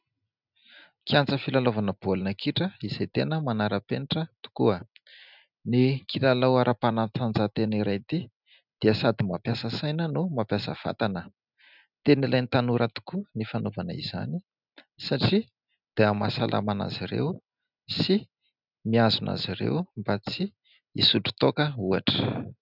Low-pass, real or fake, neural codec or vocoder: 5.4 kHz; real; none